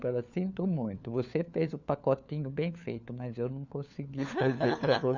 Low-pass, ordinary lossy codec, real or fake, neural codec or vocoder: 7.2 kHz; none; fake; codec, 16 kHz, 4 kbps, FunCodec, trained on LibriTTS, 50 frames a second